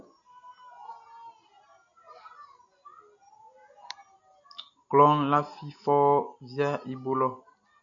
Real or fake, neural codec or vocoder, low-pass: real; none; 7.2 kHz